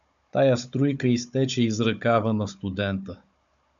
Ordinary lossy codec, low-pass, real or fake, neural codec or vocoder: MP3, 96 kbps; 7.2 kHz; fake; codec, 16 kHz, 16 kbps, FunCodec, trained on Chinese and English, 50 frames a second